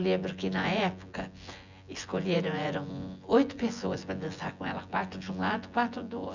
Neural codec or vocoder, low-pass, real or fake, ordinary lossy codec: vocoder, 24 kHz, 100 mel bands, Vocos; 7.2 kHz; fake; none